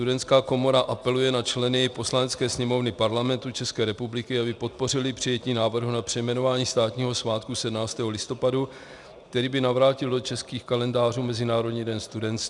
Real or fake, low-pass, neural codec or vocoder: real; 10.8 kHz; none